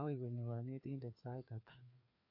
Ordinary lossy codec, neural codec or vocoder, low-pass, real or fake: MP3, 32 kbps; codec, 16 kHz, 2 kbps, FreqCodec, larger model; 5.4 kHz; fake